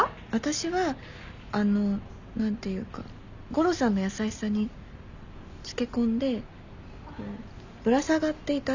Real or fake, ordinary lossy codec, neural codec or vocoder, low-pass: real; none; none; 7.2 kHz